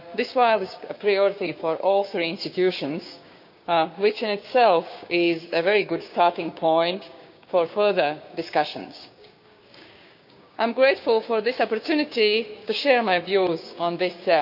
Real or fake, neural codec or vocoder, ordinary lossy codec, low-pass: fake; codec, 16 kHz, 6 kbps, DAC; none; 5.4 kHz